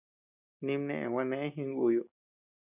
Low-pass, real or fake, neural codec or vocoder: 3.6 kHz; real; none